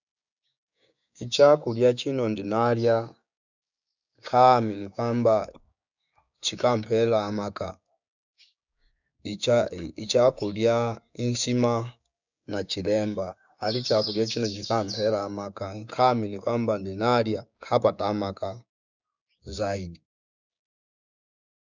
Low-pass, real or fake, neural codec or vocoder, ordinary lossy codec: 7.2 kHz; fake; codec, 16 kHz, 6 kbps, DAC; none